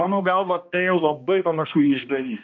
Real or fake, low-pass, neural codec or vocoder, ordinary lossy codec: fake; 7.2 kHz; codec, 16 kHz, 1 kbps, X-Codec, HuBERT features, trained on balanced general audio; MP3, 64 kbps